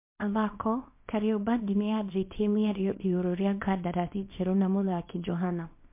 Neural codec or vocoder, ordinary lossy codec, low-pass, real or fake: codec, 24 kHz, 0.9 kbps, WavTokenizer, small release; MP3, 24 kbps; 3.6 kHz; fake